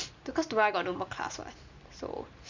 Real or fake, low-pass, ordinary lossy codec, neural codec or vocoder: real; 7.2 kHz; Opus, 64 kbps; none